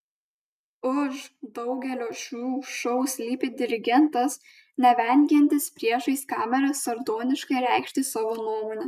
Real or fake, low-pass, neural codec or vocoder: real; 14.4 kHz; none